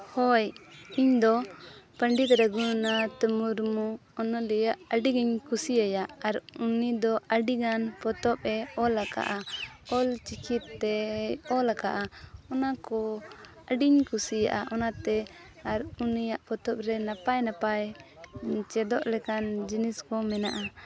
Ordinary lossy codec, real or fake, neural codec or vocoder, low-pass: none; real; none; none